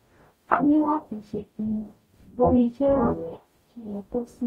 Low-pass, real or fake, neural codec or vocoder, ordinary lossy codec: 19.8 kHz; fake; codec, 44.1 kHz, 0.9 kbps, DAC; AAC, 48 kbps